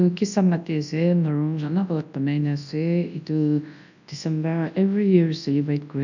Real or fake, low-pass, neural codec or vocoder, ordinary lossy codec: fake; 7.2 kHz; codec, 24 kHz, 0.9 kbps, WavTokenizer, large speech release; none